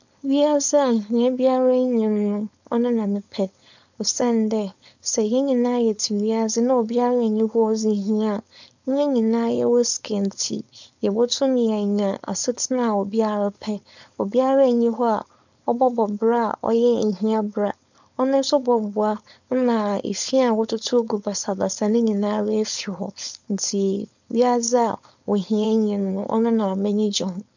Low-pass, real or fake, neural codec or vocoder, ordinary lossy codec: 7.2 kHz; fake; codec, 16 kHz, 4.8 kbps, FACodec; none